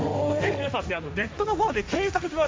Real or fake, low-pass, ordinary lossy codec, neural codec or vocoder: fake; none; none; codec, 16 kHz, 1.1 kbps, Voila-Tokenizer